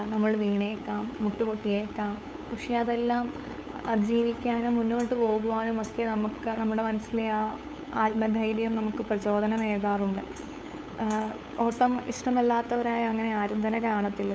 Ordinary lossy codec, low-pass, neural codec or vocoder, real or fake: none; none; codec, 16 kHz, 8 kbps, FunCodec, trained on LibriTTS, 25 frames a second; fake